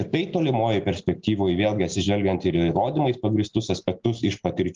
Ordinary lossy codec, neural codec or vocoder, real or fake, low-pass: Opus, 24 kbps; none; real; 7.2 kHz